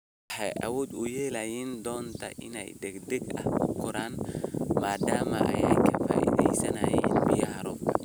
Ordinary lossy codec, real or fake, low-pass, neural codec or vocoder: none; real; none; none